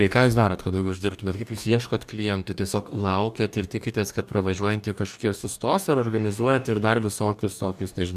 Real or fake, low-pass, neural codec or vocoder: fake; 14.4 kHz; codec, 44.1 kHz, 2.6 kbps, DAC